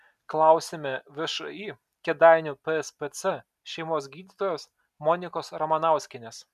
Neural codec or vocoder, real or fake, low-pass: none; real; 14.4 kHz